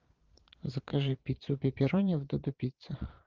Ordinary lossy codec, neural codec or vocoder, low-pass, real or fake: Opus, 24 kbps; vocoder, 22.05 kHz, 80 mel bands, Vocos; 7.2 kHz; fake